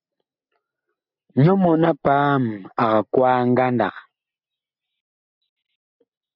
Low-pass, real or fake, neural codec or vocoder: 5.4 kHz; real; none